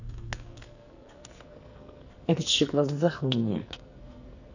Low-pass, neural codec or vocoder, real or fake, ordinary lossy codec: 7.2 kHz; codec, 44.1 kHz, 2.6 kbps, SNAC; fake; none